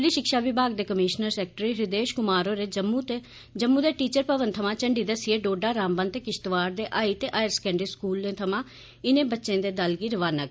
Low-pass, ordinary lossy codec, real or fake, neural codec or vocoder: none; none; real; none